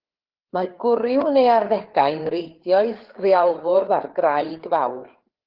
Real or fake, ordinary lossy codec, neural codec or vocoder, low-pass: fake; Opus, 16 kbps; codec, 16 kHz, 4 kbps, FreqCodec, larger model; 5.4 kHz